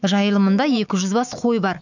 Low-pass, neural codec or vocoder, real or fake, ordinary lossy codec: 7.2 kHz; autoencoder, 48 kHz, 128 numbers a frame, DAC-VAE, trained on Japanese speech; fake; none